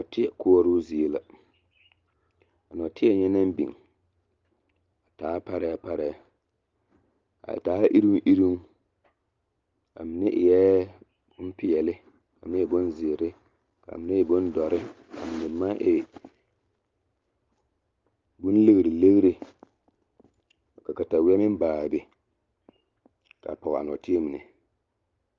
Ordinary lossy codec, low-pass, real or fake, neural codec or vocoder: Opus, 32 kbps; 7.2 kHz; real; none